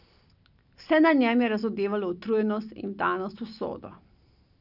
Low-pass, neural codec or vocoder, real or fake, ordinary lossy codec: 5.4 kHz; none; real; none